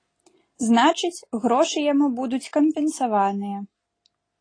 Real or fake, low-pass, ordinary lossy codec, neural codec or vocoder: real; 9.9 kHz; AAC, 32 kbps; none